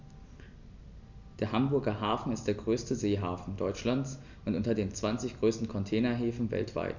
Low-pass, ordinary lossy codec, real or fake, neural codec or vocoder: 7.2 kHz; none; real; none